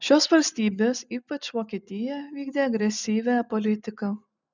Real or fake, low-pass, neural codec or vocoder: real; 7.2 kHz; none